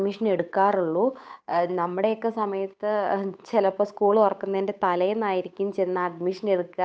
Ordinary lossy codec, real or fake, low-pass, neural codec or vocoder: none; fake; none; codec, 16 kHz, 8 kbps, FunCodec, trained on Chinese and English, 25 frames a second